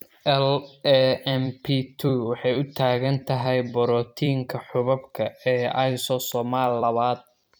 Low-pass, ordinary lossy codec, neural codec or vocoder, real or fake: none; none; vocoder, 44.1 kHz, 128 mel bands every 256 samples, BigVGAN v2; fake